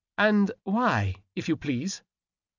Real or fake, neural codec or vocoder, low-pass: real; none; 7.2 kHz